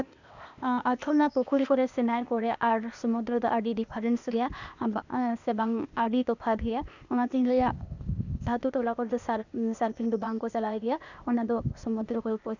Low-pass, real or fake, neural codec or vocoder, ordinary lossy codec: 7.2 kHz; fake; codec, 16 kHz, 0.8 kbps, ZipCodec; none